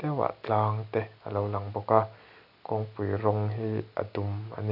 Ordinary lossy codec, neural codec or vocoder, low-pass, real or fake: none; none; 5.4 kHz; real